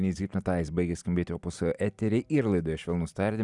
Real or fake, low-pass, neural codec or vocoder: real; 10.8 kHz; none